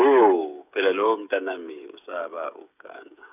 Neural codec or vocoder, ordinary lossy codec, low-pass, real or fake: codec, 16 kHz, 8 kbps, FreqCodec, smaller model; none; 3.6 kHz; fake